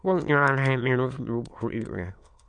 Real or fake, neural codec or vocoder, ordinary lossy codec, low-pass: fake; autoencoder, 22.05 kHz, a latent of 192 numbers a frame, VITS, trained on many speakers; MP3, 64 kbps; 9.9 kHz